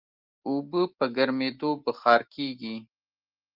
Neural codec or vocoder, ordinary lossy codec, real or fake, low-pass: none; Opus, 32 kbps; real; 5.4 kHz